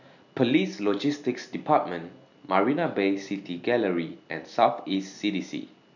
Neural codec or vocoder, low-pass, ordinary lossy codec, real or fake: none; 7.2 kHz; none; real